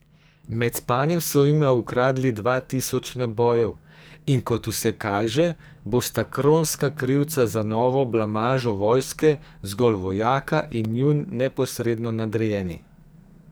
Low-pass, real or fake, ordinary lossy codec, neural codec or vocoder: none; fake; none; codec, 44.1 kHz, 2.6 kbps, SNAC